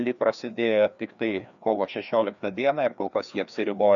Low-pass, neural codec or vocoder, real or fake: 7.2 kHz; codec, 16 kHz, 2 kbps, FreqCodec, larger model; fake